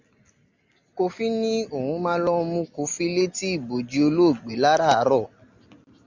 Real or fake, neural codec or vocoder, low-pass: real; none; 7.2 kHz